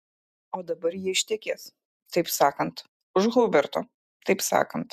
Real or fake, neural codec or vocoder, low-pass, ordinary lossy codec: fake; vocoder, 48 kHz, 128 mel bands, Vocos; 14.4 kHz; MP3, 96 kbps